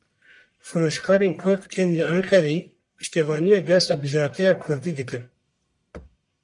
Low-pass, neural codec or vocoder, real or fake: 10.8 kHz; codec, 44.1 kHz, 1.7 kbps, Pupu-Codec; fake